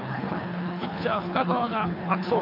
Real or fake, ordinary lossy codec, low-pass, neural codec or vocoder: fake; MP3, 48 kbps; 5.4 kHz; codec, 24 kHz, 3 kbps, HILCodec